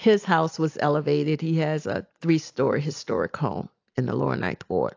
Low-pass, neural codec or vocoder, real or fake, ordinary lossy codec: 7.2 kHz; none; real; AAC, 48 kbps